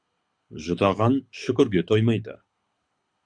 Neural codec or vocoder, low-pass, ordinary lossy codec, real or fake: codec, 24 kHz, 6 kbps, HILCodec; 9.9 kHz; Opus, 64 kbps; fake